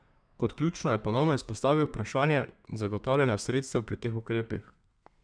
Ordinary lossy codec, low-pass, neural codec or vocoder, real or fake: none; 9.9 kHz; codec, 32 kHz, 1.9 kbps, SNAC; fake